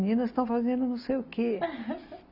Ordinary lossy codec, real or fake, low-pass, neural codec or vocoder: AAC, 48 kbps; real; 5.4 kHz; none